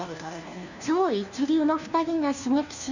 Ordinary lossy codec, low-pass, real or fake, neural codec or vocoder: none; 7.2 kHz; fake; codec, 16 kHz, 1 kbps, FunCodec, trained on LibriTTS, 50 frames a second